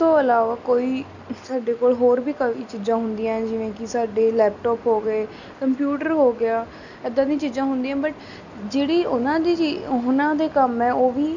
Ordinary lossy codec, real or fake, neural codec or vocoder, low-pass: none; real; none; 7.2 kHz